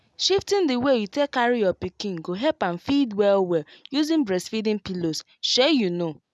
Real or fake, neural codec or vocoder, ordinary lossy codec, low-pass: real; none; none; none